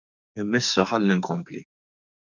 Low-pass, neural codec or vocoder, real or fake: 7.2 kHz; codec, 44.1 kHz, 2.6 kbps, SNAC; fake